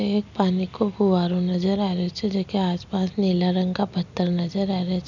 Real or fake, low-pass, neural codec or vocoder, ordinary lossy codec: real; 7.2 kHz; none; none